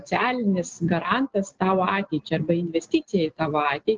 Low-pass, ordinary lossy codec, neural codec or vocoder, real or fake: 7.2 kHz; Opus, 16 kbps; none; real